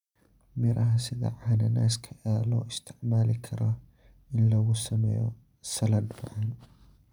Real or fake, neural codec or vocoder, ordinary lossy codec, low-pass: fake; vocoder, 44.1 kHz, 128 mel bands every 512 samples, BigVGAN v2; none; 19.8 kHz